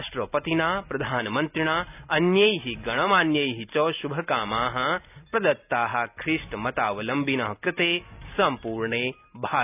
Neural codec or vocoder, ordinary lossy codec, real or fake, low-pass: none; none; real; 3.6 kHz